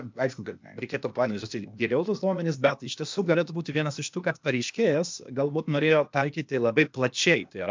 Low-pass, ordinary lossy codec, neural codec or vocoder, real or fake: 7.2 kHz; MP3, 64 kbps; codec, 16 kHz, 0.8 kbps, ZipCodec; fake